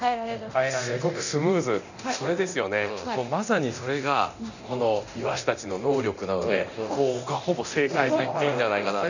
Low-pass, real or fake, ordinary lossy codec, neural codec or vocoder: 7.2 kHz; fake; none; codec, 24 kHz, 0.9 kbps, DualCodec